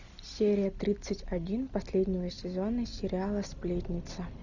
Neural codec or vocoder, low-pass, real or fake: none; 7.2 kHz; real